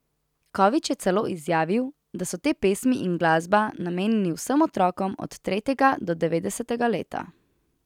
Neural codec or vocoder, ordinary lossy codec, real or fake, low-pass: none; none; real; 19.8 kHz